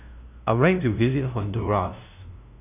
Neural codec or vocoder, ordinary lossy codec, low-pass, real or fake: codec, 16 kHz, 0.5 kbps, FunCodec, trained on LibriTTS, 25 frames a second; none; 3.6 kHz; fake